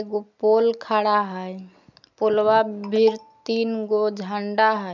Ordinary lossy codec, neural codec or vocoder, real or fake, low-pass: none; none; real; 7.2 kHz